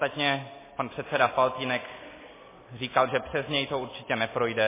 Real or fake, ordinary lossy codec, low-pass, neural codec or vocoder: real; MP3, 16 kbps; 3.6 kHz; none